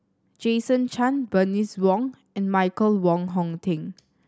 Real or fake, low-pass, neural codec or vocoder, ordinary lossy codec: real; none; none; none